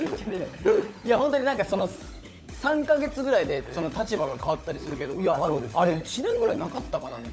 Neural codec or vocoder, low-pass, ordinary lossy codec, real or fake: codec, 16 kHz, 16 kbps, FunCodec, trained on LibriTTS, 50 frames a second; none; none; fake